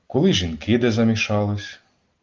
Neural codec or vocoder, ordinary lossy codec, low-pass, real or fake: none; Opus, 32 kbps; 7.2 kHz; real